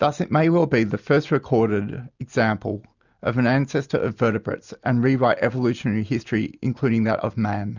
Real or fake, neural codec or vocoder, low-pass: real; none; 7.2 kHz